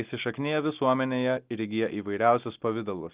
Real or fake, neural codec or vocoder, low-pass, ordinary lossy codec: real; none; 3.6 kHz; Opus, 32 kbps